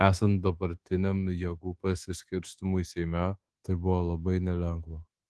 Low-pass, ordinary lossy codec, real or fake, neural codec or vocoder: 10.8 kHz; Opus, 16 kbps; fake; codec, 24 kHz, 1.2 kbps, DualCodec